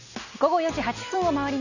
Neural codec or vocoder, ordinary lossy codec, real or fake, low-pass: none; none; real; 7.2 kHz